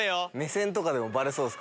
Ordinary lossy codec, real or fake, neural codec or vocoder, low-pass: none; real; none; none